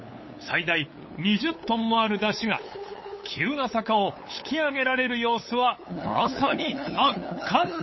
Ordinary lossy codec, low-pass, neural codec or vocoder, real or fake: MP3, 24 kbps; 7.2 kHz; codec, 16 kHz, 8 kbps, FunCodec, trained on LibriTTS, 25 frames a second; fake